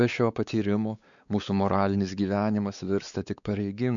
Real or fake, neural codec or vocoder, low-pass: fake; codec, 16 kHz, 4 kbps, X-Codec, WavLM features, trained on Multilingual LibriSpeech; 7.2 kHz